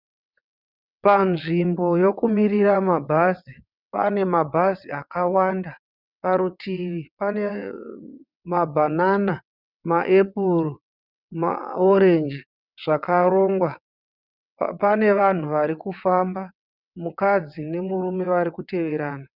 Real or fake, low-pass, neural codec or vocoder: fake; 5.4 kHz; vocoder, 22.05 kHz, 80 mel bands, WaveNeXt